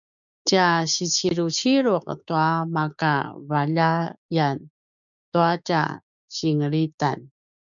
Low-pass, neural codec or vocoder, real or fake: 7.2 kHz; codec, 16 kHz, 6 kbps, DAC; fake